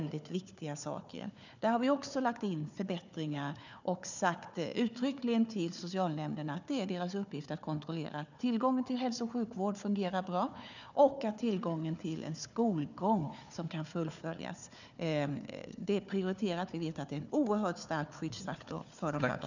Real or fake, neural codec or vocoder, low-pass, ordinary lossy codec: fake; codec, 16 kHz, 4 kbps, FunCodec, trained on Chinese and English, 50 frames a second; 7.2 kHz; none